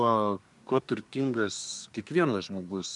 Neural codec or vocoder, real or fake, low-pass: codec, 24 kHz, 1 kbps, SNAC; fake; 10.8 kHz